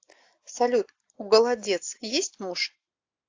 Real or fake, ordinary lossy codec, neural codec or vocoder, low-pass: real; AAC, 48 kbps; none; 7.2 kHz